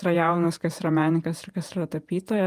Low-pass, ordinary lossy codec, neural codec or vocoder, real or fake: 14.4 kHz; Opus, 32 kbps; vocoder, 48 kHz, 128 mel bands, Vocos; fake